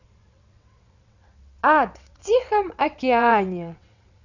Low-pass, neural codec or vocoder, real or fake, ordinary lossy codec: 7.2 kHz; vocoder, 22.05 kHz, 80 mel bands, WaveNeXt; fake; none